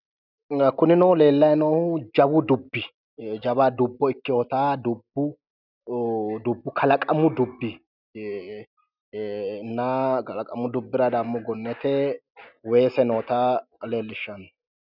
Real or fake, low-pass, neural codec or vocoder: real; 5.4 kHz; none